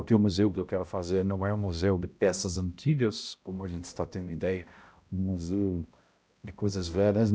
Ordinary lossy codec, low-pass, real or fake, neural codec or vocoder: none; none; fake; codec, 16 kHz, 0.5 kbps, X-Codec, HuBERT features, trained on balanced general audio